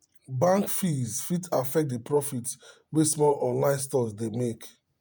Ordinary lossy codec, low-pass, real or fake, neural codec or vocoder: none; none; fake; vocoder, 48 kHz, 128 mel bands, Vocos